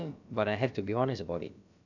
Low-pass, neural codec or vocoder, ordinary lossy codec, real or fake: 7.2 kHz; codec, 16 kHz, about 1 kbps, DyCAST, with the encoder's durations; none; fake